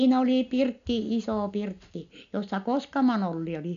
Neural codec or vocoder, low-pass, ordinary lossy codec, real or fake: none; 7.2 kHz; none; real